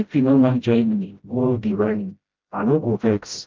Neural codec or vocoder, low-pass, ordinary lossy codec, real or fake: codec, 16 kHz, 0.5 kbps, FreqCodec, smaller model; 7.2 kHz; Opus, 32 kbps; fake